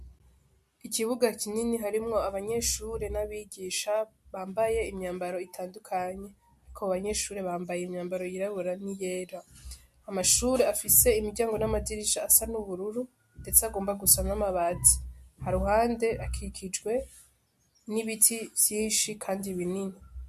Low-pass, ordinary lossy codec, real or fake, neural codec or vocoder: 14.4 kHz; MP3, 64 kbps; real; none